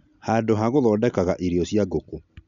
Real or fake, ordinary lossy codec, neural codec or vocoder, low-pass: real; none; none; 7.2 kHz